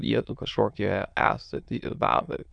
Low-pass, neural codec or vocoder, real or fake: 9.9 kHz; autoencoder, 22.05 kHz, a latent of 192 numbers a frame, VITS, trained on many speakers; fake